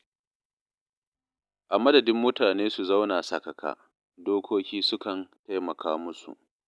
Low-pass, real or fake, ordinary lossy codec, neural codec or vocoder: none; real; none; none